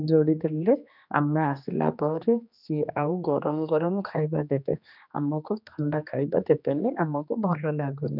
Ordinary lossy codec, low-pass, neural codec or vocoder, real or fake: none; 5.4 kHz; codec, 16 kHz, 2 kbps, X-Codec, HuBERT features, trained on general audio; fake